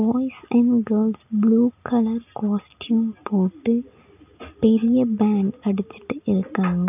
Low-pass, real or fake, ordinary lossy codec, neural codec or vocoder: 3.6 kHz; real; none; none